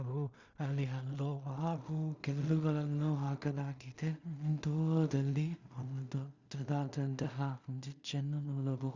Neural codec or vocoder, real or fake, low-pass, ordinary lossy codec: codec, 16 kHz in and 24 kHz out, 0.4 kbps, LongCat-Audio-Codec, two codebook decoder; fake; 7.2 kHz; Opus, 64 kbps